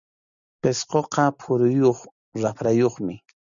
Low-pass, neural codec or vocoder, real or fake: 7.2 kHz; none; real